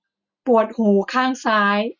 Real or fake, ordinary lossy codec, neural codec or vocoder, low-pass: real; none; none; 7.2 kHz